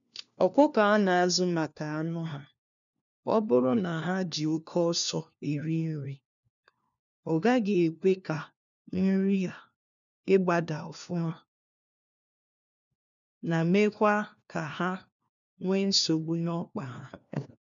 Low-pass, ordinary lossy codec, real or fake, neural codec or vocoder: 7.2 kHz; none; fake; codec, 16 kHz, 1 kbps, FunCodec, trained on LibriTTS, 50 frames a second